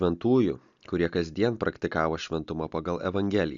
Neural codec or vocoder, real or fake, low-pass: none; real; 7.2 kHz